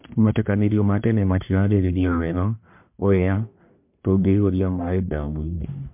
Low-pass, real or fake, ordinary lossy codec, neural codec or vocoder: 3.6 kHz; fake; MP3, 32 kbps; codec, 44.1 kHz, 1.7 kbps, Pupu-Codec